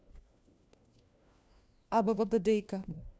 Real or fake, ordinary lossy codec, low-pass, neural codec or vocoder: fake; none; none; codec, 16 kHz, 1 kbps, FunCodec, trained on LibriTTS, 50 frames a second